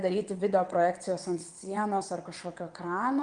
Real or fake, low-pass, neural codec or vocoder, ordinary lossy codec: fake; 9.9 kHz; vocoder, 22.05 kHz, 80 mel bands, WaveNeXt; Opus, 32 kbps